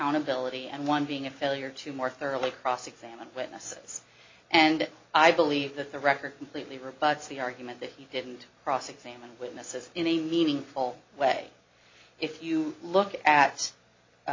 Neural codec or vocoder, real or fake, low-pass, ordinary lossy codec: none; real; 7.2 kHz; MP3, 32 kbps